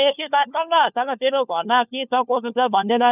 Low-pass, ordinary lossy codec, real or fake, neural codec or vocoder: 3.6 kHz; none; fake; codec, 16 kHz, 4 kbps, FunCodec, trained on LibriTTS, 50 frames a second